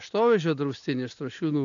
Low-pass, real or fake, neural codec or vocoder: 7.2 kHz; real; none